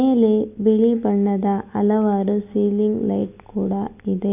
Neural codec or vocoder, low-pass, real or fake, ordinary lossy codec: none; 3.6 kHz; real; none